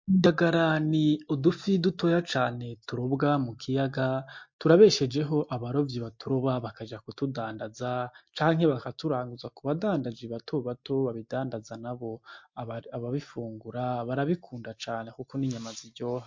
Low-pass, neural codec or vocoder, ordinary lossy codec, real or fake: 7.2 kHz; none; MP3, 48 kbps; real